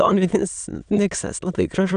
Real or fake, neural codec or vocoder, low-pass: fake; autoencoder, 22.05 kHz, a latent of 192 numbers a frame, VITS, trained on many speakers; 9.9 kHz